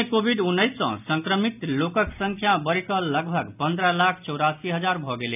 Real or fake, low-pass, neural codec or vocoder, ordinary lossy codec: real; 3.6 kHz; none; none